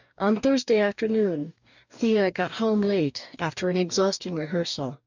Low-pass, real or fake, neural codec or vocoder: 7.2 kHz; fake; codec, 44.1 kHz, 2.6 kbps, DAC